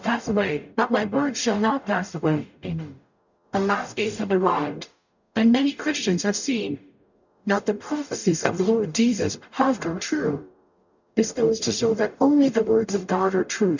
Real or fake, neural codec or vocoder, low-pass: fake; codec, 44.1 kHz, 0.9 kbps, DAC; 7.2 kHz